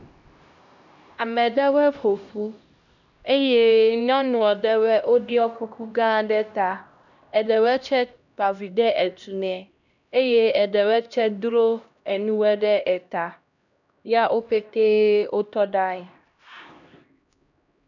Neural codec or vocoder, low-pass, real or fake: codec, 16 kHz, 1 kbps, X-Codec, HuBERT features, trained on LibriSpeech; 7.2 kHz; fake